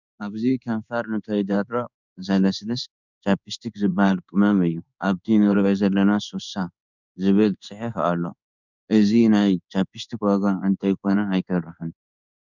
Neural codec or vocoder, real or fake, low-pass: codec, 16 kHz in and 24 kHz out, 1 kbps, XY-Tokenizer; fake; 7.2 kHz